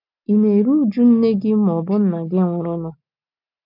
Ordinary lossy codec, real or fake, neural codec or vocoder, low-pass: none; real; none; 5.4 kHz